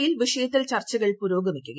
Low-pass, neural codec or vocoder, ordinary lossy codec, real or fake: none; none; none; real